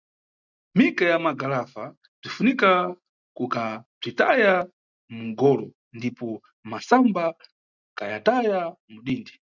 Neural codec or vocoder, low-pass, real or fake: none; 7.2 kHz; real